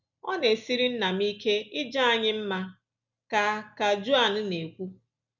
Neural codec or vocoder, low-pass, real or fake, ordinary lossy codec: none; 7.2 kHz; real; none